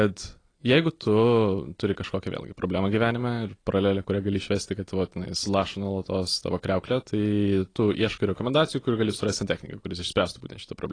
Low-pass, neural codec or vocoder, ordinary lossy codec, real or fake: 9.9 kHz; none; AAC, 32 kbps; real